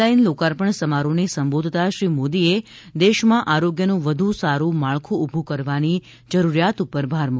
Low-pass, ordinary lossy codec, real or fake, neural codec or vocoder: none; none; real; none